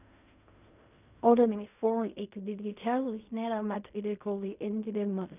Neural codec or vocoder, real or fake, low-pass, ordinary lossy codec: codec, 16 kHz in and 24 kHz out, 0.4 kbps, LongCat-Audio-Codec, fine tuned four codebook decoder; fake; 3.6 kHz; none